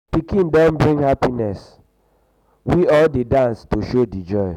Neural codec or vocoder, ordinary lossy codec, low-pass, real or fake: none; none; 19.8 kHz; real